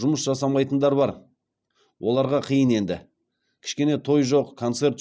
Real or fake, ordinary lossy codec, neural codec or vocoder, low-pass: real; none; none; none